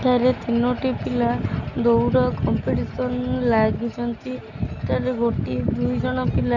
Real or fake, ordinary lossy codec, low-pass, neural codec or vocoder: real; none; 7.2 kHz; none